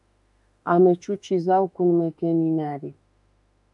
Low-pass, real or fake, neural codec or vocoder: 10.8 kHz; fake; autoencoder, 48 kHz, 32 numbers a frame, DAC-VAE, trained on Japanese speech